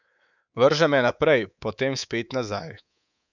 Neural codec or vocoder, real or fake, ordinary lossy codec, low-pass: codec, 24 kHz, 3.1 kbps, DualCodec; fake; none; 7.2 kHz